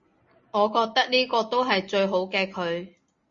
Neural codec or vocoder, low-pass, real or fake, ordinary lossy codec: none; 7.2 kHz; real; MP3, 32 kbps